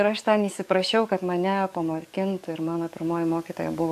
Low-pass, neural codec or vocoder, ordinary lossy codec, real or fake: 14.4 kHz; codec, 44.1 kHz, 7.8 kbps, DAC; AAC, 96 kbps; fake